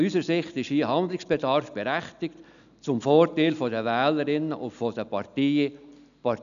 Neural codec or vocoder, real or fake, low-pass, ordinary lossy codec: none; real; 7.2 kHz; none